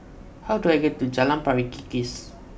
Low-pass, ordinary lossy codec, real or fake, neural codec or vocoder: none; none; real; none